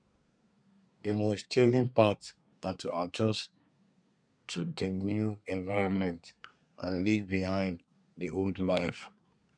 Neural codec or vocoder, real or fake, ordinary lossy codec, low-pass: codec, 24 kHz, 1 kbps, SNAC; fake; none; 9.9 kHz